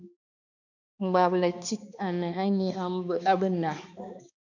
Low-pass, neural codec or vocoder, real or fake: 7.2 kHz; codec, 16 kHz, 2 kbps, X-Codec, HuBERT features, trained on balanced general audio; fake